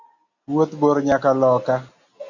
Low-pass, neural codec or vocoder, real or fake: 7.2 kHz; none; real